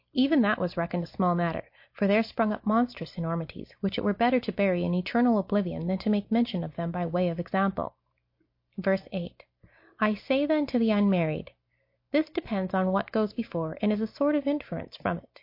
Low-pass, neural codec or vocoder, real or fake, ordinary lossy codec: 5.4 kHz; none; real; MP3, 32 kbps